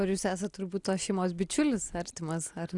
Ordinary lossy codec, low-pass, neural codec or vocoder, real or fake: Opus, 64 kbps; 10.8 kHz; none; real